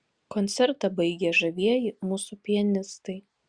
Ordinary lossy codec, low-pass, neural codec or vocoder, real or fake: Opus, 64 kbps; 9.9 kHz; none; real